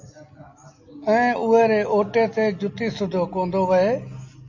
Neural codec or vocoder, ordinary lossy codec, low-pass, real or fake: none; AAC, 48 kbps; 7.2 kHz; real